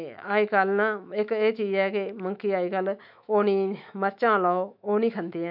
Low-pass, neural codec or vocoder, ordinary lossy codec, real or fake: 5.4 kHz; autoencoder, 48 kHz, 128 numbers a frame, DAC-VAE, trained on Japanese speech; none; fake